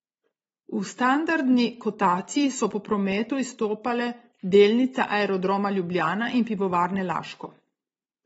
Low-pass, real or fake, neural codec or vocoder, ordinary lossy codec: 19.8 kHz; real; none; AAC, 24 kbps